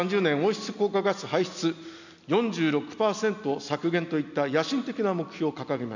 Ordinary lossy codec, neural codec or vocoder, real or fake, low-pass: none; none; real; 7.2 kHz